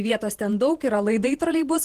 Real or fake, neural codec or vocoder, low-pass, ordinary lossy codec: fake; vocoder, 48 kHz, 128 mel bands, Vocos; 14.4 kHz; Opus, 16 kbps